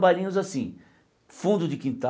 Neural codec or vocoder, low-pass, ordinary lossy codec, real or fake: none; none; none; real